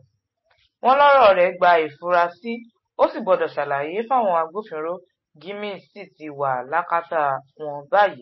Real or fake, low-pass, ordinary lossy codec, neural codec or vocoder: real; 7.2 kHz; MP3, 24 kbps; none